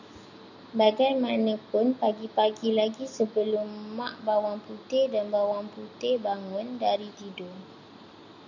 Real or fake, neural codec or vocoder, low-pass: real; none; 7.2 kHz